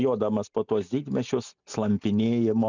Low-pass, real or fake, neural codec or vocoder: 7.2 kHz; real; none